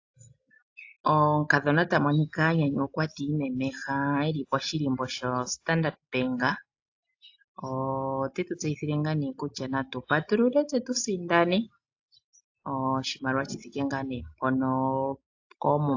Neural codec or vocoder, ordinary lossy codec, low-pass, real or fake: none; AAC, 48 kbps; 7.2 kHz; real